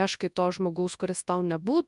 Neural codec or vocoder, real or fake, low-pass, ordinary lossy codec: codec, 24 kHz, 0.9 kbps, WavTokenizer, large speech release; fake; 10.8 kHz; MP3, 96 kbps